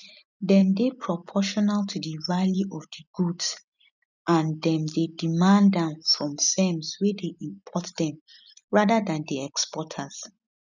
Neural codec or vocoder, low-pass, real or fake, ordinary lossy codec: none; 7.2 kHz; real; none